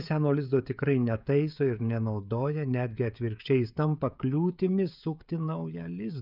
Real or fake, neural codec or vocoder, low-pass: fake; codec, 16 kHz, 16 kbps, FreqCodec, smaller model; 5.4 kHz